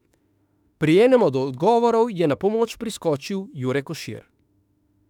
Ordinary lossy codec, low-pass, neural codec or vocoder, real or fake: none; 19.8 kHz; autoencoder, 48 kHz, 32 numbers a frame, DAC-VAE, trained on Japanese speech; fake